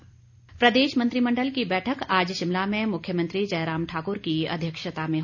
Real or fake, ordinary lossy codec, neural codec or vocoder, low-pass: real; none; none; 7.2 kHz